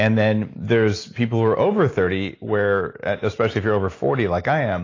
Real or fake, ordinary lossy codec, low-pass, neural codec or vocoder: real; AAC, 32 kbps; 7.2 kHz; none